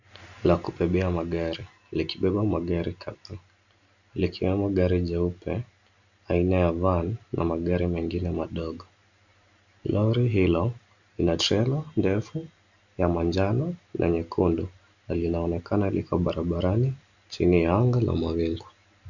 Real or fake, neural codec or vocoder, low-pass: real; none; 7.2 kHz